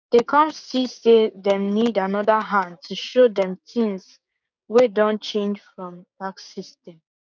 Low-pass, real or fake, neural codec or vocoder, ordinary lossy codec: 7.2 kHz; fake; codec, 16 kHz, 6 kbps, DAC; none